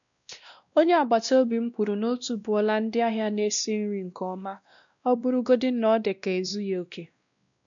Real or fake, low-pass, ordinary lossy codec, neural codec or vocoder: fake; 7.2 kHz; MP3, 96 kbps; codec, 16 kHz, 1 kbps, X-Codec, WavLM features, trained on Multilingual LibriSpeech